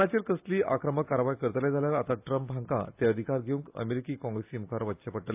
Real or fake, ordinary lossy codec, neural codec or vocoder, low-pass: real; AAC, 32 kbps; none; 3.6 kHz